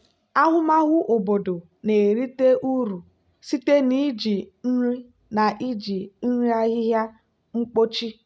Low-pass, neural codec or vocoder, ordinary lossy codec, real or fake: none; none; none; real